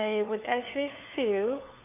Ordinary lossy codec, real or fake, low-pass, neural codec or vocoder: none; fake; 3.6 kHz; codec, 16 kHz, 4 kbps, FunCodec, trained on LibriTTS, 50 frames a second